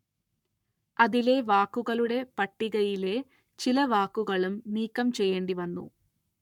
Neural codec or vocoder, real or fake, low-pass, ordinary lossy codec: codec, 44.1 kHz, 7.8 kbps, Pupu-Codec; fake; 19.8 kHz; none